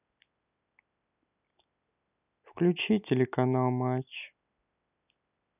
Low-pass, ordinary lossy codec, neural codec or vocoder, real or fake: 3.6 kHz; none; none; real